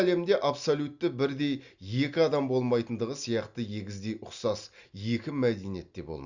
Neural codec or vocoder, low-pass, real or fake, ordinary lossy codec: none; 7.2 kHz; real; Opus, 64 kbps